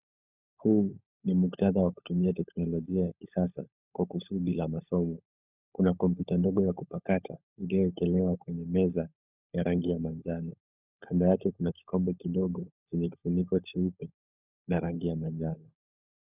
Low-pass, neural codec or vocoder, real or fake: 3.6 kHz; codec, 16 kHz, 16 kbps, FunCodec, trained on LibriTTS, 50 frames a second; fake